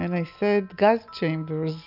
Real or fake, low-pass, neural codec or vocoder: real; 5.4 kHz; none